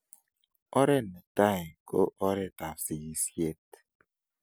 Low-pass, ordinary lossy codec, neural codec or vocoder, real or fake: none; none; none; real